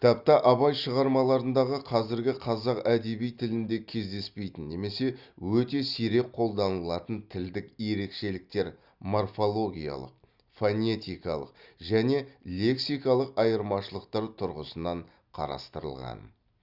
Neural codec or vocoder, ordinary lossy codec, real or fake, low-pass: none; Opus, 64 kbps; real; 5.4 kHz